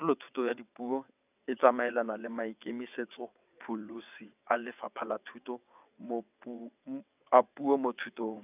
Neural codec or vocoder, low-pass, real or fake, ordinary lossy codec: vocoder, 22.05 kHz, 80 mel bands, WaveNeXt; 3.6 kHz; fake; none